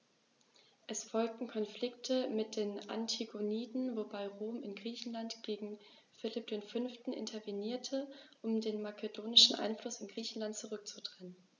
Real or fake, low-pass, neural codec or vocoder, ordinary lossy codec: real; 7.2 kHz; none; none